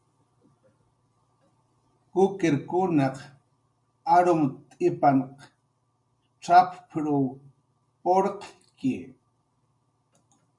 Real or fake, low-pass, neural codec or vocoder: real; 10.8 kHz; none